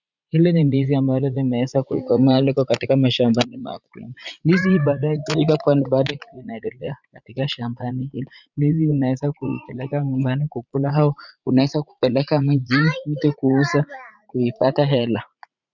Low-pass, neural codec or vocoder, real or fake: 7.2 kHz; vocoder, 44.1 kHz, 80 mel bands, Vocos; fake